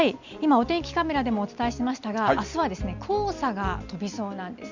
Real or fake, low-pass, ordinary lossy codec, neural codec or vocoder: real; 7.2 kHz; Opus, 64 kbps; none